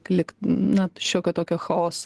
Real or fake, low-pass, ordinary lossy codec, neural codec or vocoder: real; 10.8 kHz; Opus, 16 kbps; none